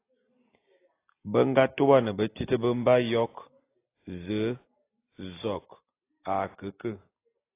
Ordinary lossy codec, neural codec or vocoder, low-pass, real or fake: AAC, 24 kbps; none; 3.6 kHz; real